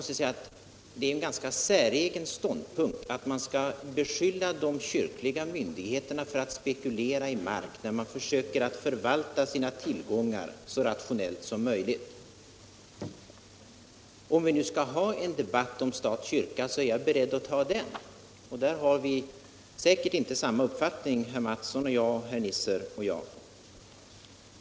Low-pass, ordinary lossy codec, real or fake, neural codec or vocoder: none; none; real; none